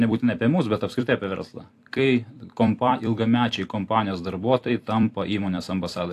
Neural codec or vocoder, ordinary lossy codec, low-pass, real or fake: vocoder, 44.1 kHz, 128 mel bands every 256 samples, BigVGAN v2; AAC, 64 kbps; 14.4 kHz; fake